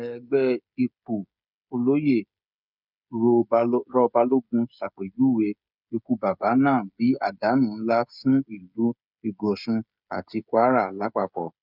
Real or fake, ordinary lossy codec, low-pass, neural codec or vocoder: fake; none; 5.4 kHz; codec, 16 kHz, 16 kbps, FreqCodec, smaller model